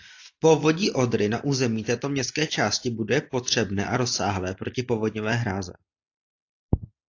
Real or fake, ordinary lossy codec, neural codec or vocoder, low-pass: real; AAC, 48 kbps; none; 7.2 kHz